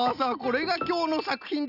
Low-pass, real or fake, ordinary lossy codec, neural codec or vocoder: 5.4 kHz; real; none; none